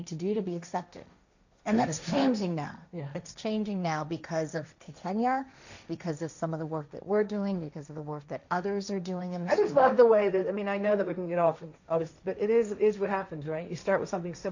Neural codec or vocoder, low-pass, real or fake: codec, 16 kHz, 1.1 kbps, Voila-Tokenizer; 7.2 kHz; fake